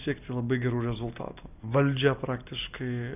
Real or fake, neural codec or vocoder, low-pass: real; none; 3.6 kHz